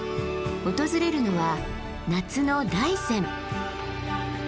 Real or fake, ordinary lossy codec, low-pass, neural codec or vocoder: real; none; none; none